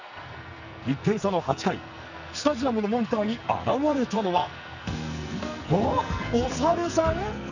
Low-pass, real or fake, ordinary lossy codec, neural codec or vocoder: 7.2 kHz; fake; none; codec, 44.1 kHz, 2.6 kbps, SNAC